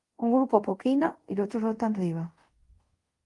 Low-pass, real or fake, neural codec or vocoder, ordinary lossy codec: 10.8 kHz; fake; codec, 24 kHz, 0.5 kbps, DualCodec; Opus, 24 kbps